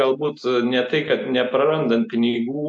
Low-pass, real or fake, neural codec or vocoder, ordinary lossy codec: 9.9 kHz; real; none; AAC, 64 kbps